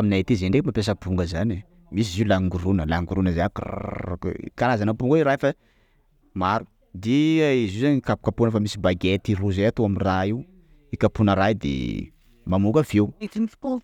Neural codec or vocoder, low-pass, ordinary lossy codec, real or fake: none; 19.8 kHz; none; real